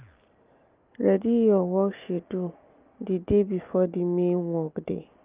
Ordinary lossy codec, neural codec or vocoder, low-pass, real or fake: Opus, 32 kbps; none; 3.6 kHz; real